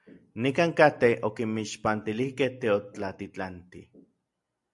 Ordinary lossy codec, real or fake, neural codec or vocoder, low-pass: AAC, 64 kbps; real; none; 10.8 kHz